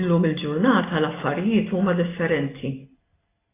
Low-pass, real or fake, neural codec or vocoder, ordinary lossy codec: 3.6 kHz; real; none; AAC, 16 kbps